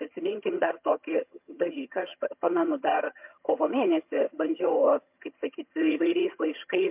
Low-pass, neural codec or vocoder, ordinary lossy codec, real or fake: 3.6 kHz; vocoder, 22.05 kHz, 80 mel bands, HiFi-GAN; MP3, 32 kbps; fake